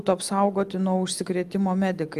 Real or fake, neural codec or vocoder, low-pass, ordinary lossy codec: real; none; 14.4 kHz; Opus, 32 kbps